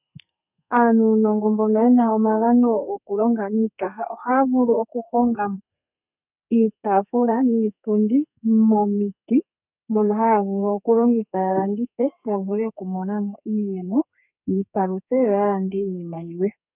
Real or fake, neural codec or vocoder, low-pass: fake; codec, 32 kHz, 1.9 kbps, SNAC; 3.6 kHz